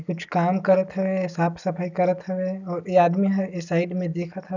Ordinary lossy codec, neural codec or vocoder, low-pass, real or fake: none; none; 7.2 kHz; real